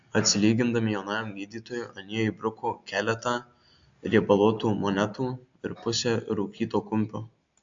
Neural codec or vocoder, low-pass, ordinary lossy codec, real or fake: none; 7.2 kHz; AAC, 64 kbps; real